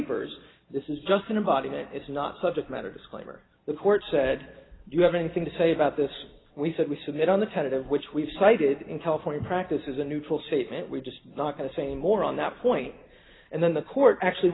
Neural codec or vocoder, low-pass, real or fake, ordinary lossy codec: none; 7.2 kHz; real; AAC, 16 kbps